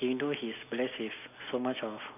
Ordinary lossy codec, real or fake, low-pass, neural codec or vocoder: none; real; 3.6 kHz; none